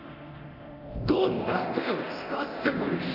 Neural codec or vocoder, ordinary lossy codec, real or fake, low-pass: codec, 24 kHz, 0.9 kbps, DualCodec; none; fake; 5.4 kHz